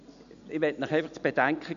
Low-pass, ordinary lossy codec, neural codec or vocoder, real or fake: 7.2 kHz; none; none; real